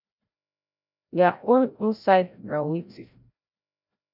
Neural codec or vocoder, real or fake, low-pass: codec, 16 kHz, 0.5 kbps, FreqCodec, larger model; fake; 5.4 kHz